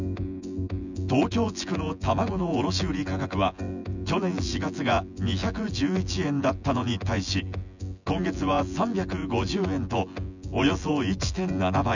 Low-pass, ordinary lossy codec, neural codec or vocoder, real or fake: 7.2 kHz; none; vocoder, 24 kHz, 100 mel bands, Vocos; fake